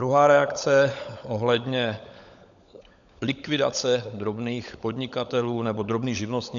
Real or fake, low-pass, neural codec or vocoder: fake; 7.2 kHz; codec, 16 kHz, 16 kbps, FunCodec, trained on LibriTTS, 50 frames a second